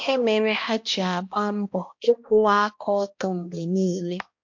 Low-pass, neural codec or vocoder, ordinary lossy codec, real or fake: 7.2 kHz; codec, 16 kHz, 1 kbps, X-Codec, HuBERT features, trained on balanced general audio; MP3, 48 kbps; fake